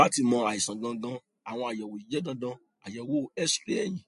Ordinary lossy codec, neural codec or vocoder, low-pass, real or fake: MP3, 48 kbps; none; 10.8 kHz; real